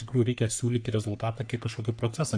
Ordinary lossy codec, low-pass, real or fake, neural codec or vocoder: AAC, 64 kbps; 9.9 kHz; fake; codec, 44.1 kHz, 3.4 kbps, Pupu-Codec